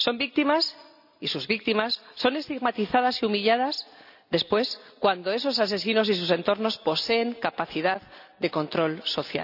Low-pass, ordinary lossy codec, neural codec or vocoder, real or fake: 5.4 kHz; none; none; real